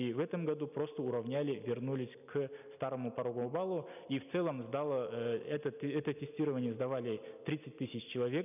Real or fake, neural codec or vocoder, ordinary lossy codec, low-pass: real; none; none; 3.6 kHz